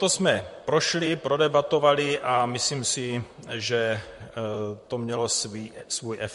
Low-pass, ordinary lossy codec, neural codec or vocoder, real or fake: 14.4 kHz; MP3, 48 kbps; vocoder, 44.1 kHz, 128 mel bands, Pupu-Vocoder; fake